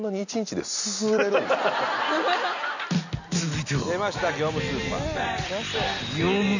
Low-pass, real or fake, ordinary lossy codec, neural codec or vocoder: 7.2 kHz; real; none; none